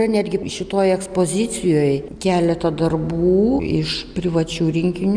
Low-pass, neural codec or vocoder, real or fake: 9.9 kHz; none; real